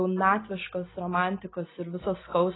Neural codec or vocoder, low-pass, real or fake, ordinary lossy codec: none; 7.2 kHz; real; AAC, 16 kbps